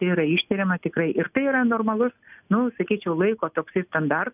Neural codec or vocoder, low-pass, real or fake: none; 3.6 kHz; real